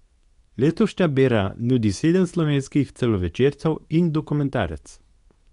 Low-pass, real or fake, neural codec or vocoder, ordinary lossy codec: 10.8 kHz; fake; codec, 24 kHz, 0.9 kbps, WavTokenizer, medium speech release version 2; none